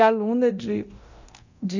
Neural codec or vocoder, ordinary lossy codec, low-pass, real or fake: codec, 24 kHz, 0.9 kbps, DualCodec; none; 7.2 kHz; fake